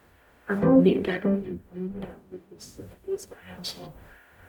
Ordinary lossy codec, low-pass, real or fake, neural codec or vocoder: none; 19.8 kHz; fake; codec, 44.1 kHz, 0.9 kbps, DAC